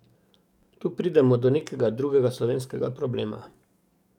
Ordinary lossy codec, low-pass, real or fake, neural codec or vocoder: none; 19.8 kHz; fake; codec, 44.1 kHz, 7.8 kbps, DAC